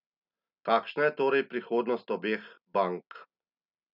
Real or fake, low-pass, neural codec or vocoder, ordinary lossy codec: real; 5.4 kHz; none; none